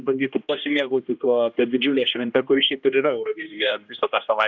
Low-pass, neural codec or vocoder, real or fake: 7.2 kHz; codec, 16 kHz, 1 kbps, X-Codec, HuBERT features, trained on general audio; fake